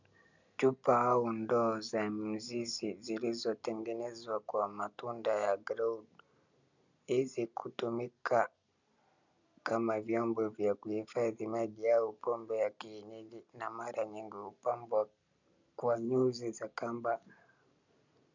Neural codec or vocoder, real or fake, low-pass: none; real; 7.2 kHz